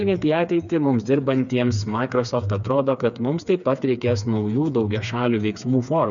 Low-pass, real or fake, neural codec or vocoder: 7.2 kHz; fake; codec, 16 kHz, 4 kbps, FreqCodec, smaller model